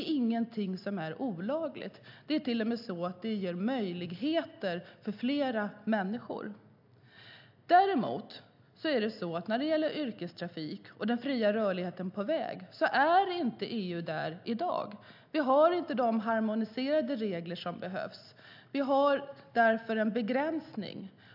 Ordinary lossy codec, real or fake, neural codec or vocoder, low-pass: AAC, 48 kbps; real; none; 5.4 kHz